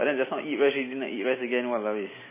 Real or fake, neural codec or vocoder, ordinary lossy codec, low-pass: real; none; MP3, 16 kbps; 3.6 kHz